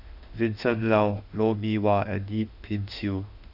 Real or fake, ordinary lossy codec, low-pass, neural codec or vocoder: fake; Opus, 64 kbps; 5.4 kHz; autoencoder, 48 kHz, 32 numbers a frame, DAC-VAE, trained on Japanese speech